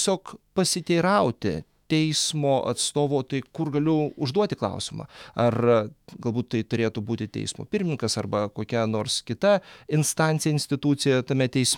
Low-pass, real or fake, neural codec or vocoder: 19.8 kHz; fake; autoencoder, 48 kHz, 128 numbers a frame, DAC-VAE, trained on Japanese speech